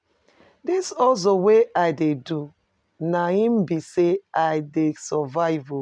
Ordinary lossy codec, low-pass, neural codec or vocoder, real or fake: MP3, 96 kbps; 9.9 kHz; none; real